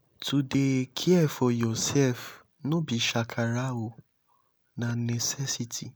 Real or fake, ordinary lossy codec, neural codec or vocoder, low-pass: real; none; none; none